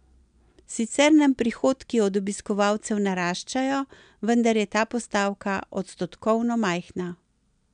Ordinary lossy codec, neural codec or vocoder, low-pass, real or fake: MP3, 96 kbps; none; 9.9 kHz; real